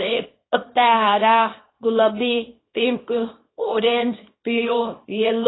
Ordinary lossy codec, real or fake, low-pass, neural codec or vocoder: AAC, 16 kbps; fake; 7.2 kHz; codec, 24 kHz, 0.9 kbps, WavTokenizer, small release